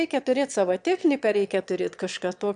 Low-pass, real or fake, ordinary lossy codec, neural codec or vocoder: 9.9 kHz; fake; MP3, 96 kbps; autoencoder, 22.05 kHz, a latent of 192 numbers a frame, VITS, trained on one speaker